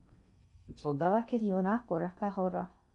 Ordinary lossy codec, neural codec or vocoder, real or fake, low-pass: none; codec, 16 kHz in and 24 kHz out, 0.6 kbps, FocalCodec, streaming, 4096 codes; fake; 10.8 kHz